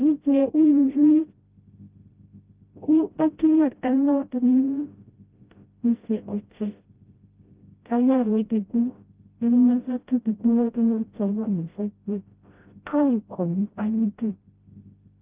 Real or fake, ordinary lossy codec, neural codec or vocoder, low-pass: fake; Opus, 24 kbps; codec, 16 kHz, 0.5 kbps, FreqCodec, smaller model; 3.6 kHz